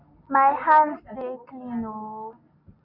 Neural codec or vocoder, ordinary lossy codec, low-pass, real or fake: none; none; 5.4 kHz; real